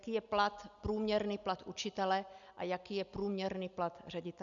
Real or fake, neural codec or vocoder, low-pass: real; none; 7.2 kHz